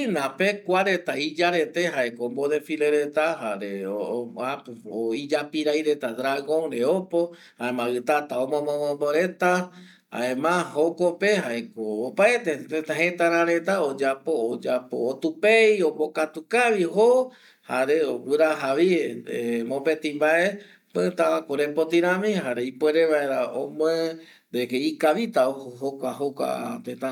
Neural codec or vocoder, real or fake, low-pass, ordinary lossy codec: none; real; 19.8 kHz; none